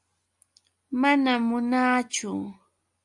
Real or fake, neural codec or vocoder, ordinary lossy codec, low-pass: real; none; AAC, 64 kbps; 10.8 kHz